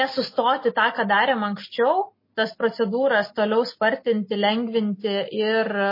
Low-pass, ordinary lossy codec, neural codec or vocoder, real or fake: 5.4 kHz; MP3, 24 kbps; none; real